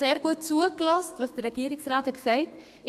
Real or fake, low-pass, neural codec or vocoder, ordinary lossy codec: fake; 14.4 kHz; codec, 32 kHz, 1.9 kbps, SNAC; MP3, 96 kbps